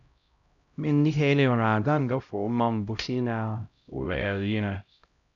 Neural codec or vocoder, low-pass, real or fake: codec, 16 kHz, 0.5 kbps, X-Codec, HuBERT features, trained on LibriSpeech; 7.2 kHz; fake